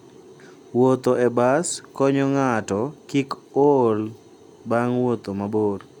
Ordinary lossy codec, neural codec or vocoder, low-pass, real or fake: none; none; 19.8 kHz; real